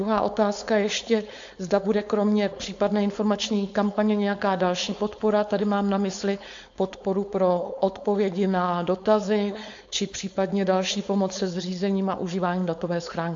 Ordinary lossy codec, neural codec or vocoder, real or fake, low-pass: AAC, 64 kbps; codec, 16 kHz, 4.8 kbps, FACodec; fake; 7.2 kHz